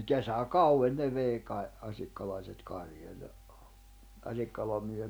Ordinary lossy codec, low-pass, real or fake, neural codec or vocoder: none; none; real; none